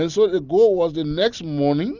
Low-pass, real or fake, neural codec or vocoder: 7.2 kHz; real; none